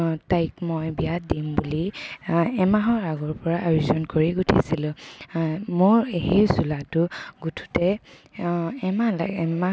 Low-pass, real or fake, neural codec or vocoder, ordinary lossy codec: none; real; none; none